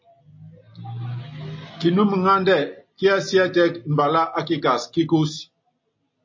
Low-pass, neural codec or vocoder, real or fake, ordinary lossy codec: 7.2 kHz; none; real; MP3, 32 kbps